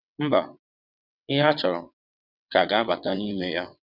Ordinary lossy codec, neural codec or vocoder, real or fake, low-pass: none; vocoder, 22.05 kHz, 80 mel bands, WaveNeXt; fake; 5.4 kHz